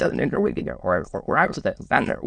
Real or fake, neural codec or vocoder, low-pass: fake; autoencoder, 22.05 kHz, a latent of 192 numbers a frame, VITS, trained on many speakers; 9.9 kHz